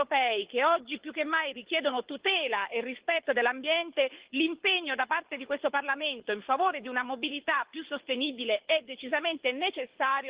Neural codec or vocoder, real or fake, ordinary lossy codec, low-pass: codec, 24 kHz, 6 kbps, HILCodec; fake; Opus, 16 kbps; 3.6 kHz